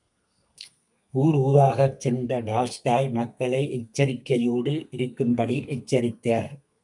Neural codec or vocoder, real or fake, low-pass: codec, 32 kHz, 1.9 kbps, SNAC; fake; 10.8 kHz